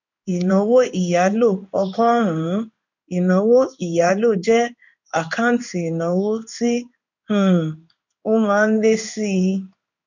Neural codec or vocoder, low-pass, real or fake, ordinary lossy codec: codec, 16 kHz in and 24 kHz out, 1 kbps, XY-Tokenizer; 7.2 kHz; fake; none